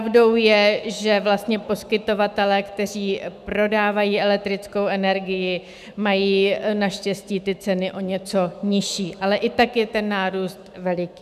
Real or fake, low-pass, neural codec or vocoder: fake; 14.4 kHz; autoencoder, 48 kHz, 128 numbers a frame, DAC-VAE, trained on Japanese speech